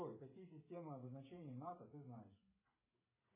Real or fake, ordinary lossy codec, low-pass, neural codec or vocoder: fake; MP3, 16 kbps; 3.6 kHz; codec, 16 kHz, 8 kbps, FreqCodec, smaller model